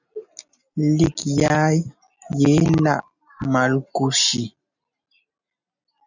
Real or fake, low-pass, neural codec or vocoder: real; 7.2 kHz; none